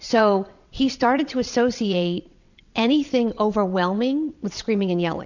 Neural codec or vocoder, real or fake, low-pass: vocoder, 22.05 kHz, 80 mel bands, WaveNeXt; fake; 7.2 kHz